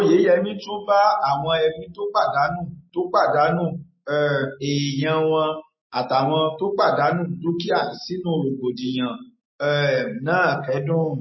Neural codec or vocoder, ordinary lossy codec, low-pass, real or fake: none; MP3, 24 kbps; 7.2 kHz; real